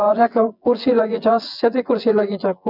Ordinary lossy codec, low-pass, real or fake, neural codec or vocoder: none; 5.4 kHz; fake; vocoder, 24 kHz, 100 mel bands, Vocos